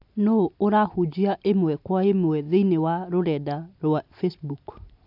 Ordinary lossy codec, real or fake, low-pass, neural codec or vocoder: AAC, 48 kbps; real; 5.4 kHz; none